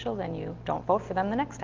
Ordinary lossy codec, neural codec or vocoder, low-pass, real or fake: Opus, 24 kbps; none; 7.2 kHz; real